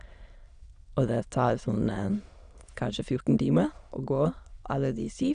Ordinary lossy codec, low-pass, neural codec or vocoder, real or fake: none; 9.9 kHz; autoencoder, 22.05 kHz, a latent of 192 numbers a frame, VITS, trained on many speakers; fake